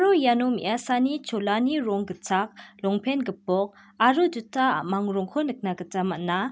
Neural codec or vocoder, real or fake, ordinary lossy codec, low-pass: none; real; none; none